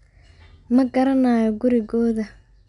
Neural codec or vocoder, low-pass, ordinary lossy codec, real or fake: none; 10.8 kHz; none; real